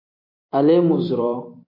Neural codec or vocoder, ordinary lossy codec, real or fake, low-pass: vocoder, 44.1 kHz, 128 mel bands every 256 samples, BigVGAN v2; AAC, 24 kbps; fake; 5.4 kHz